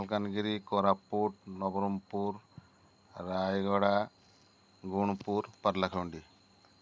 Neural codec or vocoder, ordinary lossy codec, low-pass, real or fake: none; none; none; real